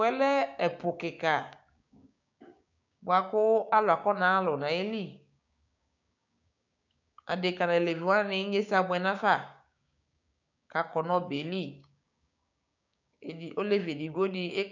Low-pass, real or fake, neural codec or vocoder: 7.2 kHz; fake; codec, 16 kHz, 6 kbps, DAC